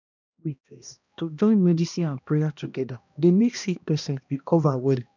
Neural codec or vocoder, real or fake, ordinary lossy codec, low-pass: codec, 16 kHz, 1 kbps, X-Codec, HuBERT features, trained on balanced general audio; fake; none; 7.2 kHz